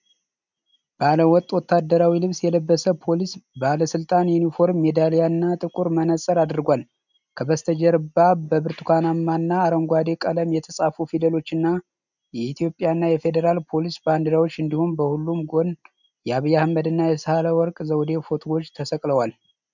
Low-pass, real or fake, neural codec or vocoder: 7.2 kHz; real; none